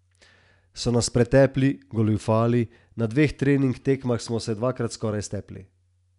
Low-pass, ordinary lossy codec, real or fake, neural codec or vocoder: 10.8 kHz; none; real; none